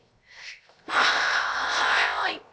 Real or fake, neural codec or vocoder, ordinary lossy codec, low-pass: fake; codec, 16 kHz, 0.3 kbps, FocalCodec; none; none